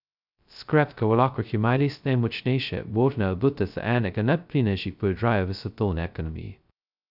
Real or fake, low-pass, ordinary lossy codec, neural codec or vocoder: fake; 5.4 kHz; none; codec, 16 kHz, 0.2 kbps, FocalCodec